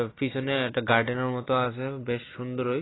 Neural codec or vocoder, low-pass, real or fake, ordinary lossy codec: none; 7.2 kHz; real; AAC, 16 kbps